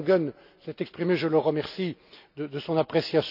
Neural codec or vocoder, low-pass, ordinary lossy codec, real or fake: none; 5.4 kHz; none; real